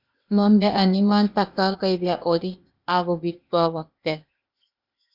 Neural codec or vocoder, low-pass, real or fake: codec, 16 kHz, 0.8 kbps, ZipCodec; 5.4 kHz; fake